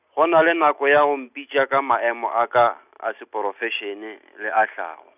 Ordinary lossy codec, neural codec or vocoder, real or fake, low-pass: none; none; real; 3.6 kHz